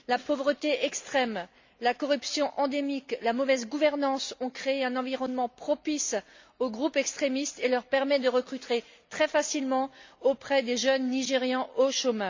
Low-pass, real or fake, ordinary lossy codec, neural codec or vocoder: 7.2 kHz; real; MP3, 64 kbps; none